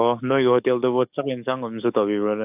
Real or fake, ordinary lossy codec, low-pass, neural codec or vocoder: fake; AAC, 32 kbps; 3.6 kHz; codec, 44.1 kHz, 7.8 kbps, DAC